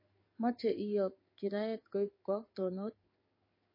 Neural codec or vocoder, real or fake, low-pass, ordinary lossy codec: codec, 16 kHz in and 24 kHz out, 1 kbps, XY-Tokenizer; fake; 5.4 kHz; MP3, 24 kbps